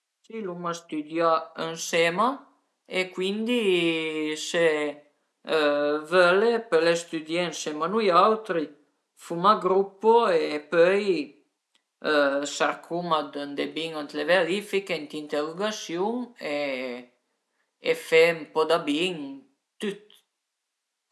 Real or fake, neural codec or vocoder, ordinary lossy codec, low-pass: real; none; none; none